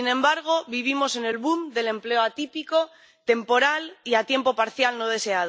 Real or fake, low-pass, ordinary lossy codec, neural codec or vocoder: real; none; none; none